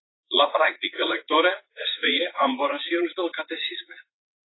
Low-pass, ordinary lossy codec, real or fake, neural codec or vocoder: 7.2 kHz; AAC, 16 kbps; fake; vocoder, 44.1 kHz, 80 mel bands, Vocos